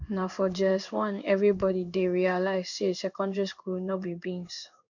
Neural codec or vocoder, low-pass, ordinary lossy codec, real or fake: codec, 16 kHz in and 24 kHz out, 1 kbps, XY-Tokenizer; 7.2 kHz; none; fake